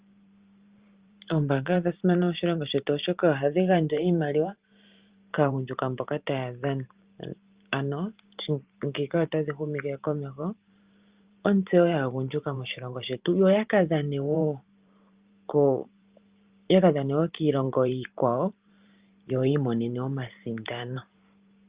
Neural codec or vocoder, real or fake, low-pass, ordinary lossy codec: vocoder, 44.1 kHz, 128 mel bands every 512 samples, BigVGAN v2; fake; 3.6 kHz; Opus, 32 kbps